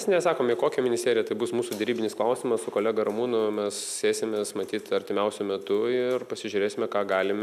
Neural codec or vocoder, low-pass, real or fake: none; 14.4 kHz; real